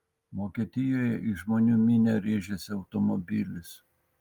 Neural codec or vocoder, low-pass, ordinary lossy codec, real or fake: none; 19.8 kHz; Opus, 32 kbps; real